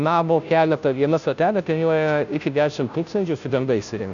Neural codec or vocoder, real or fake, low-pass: codec, 16 kHz, 0.5 kbps, FunCodec, trained on Chinese and English, 25 frames a second; fake; 7.2 kHz